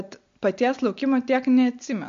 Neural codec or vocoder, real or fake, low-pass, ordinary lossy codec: none; real; 7.2 kHz; MP3, 48 kbps